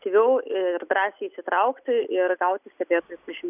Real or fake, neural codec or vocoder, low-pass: real; none; 3.6 kHz